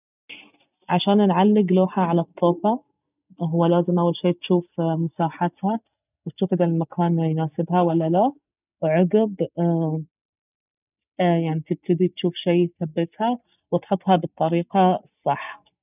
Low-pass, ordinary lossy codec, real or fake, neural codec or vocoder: 3.6 kHz; none; real; none